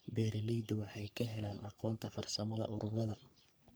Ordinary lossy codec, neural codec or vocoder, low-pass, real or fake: none; codec, 44.1 kHz, 3.4 kbps, Pupu-Codec; none; fake